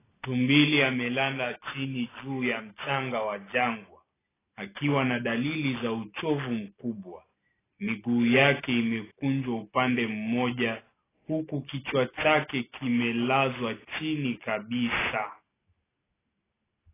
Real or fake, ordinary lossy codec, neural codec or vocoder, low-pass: real; AAC, 16 kbps; none; 3.6 kHz